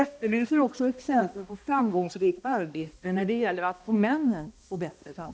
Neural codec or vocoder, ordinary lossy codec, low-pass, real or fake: codec, 16 kHz, 1 kbps, X-Codec, HuBERT features, trained on balanced general audio; none; none; fake